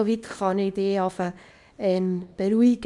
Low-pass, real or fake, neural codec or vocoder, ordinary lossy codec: 10.8 kHz; fake; codec, 24 kHz, 0.9 kbps, WavTokenizer, small release; none